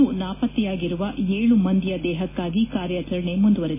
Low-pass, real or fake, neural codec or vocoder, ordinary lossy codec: 3.6 kHz; real; none; MP3, 16 kbps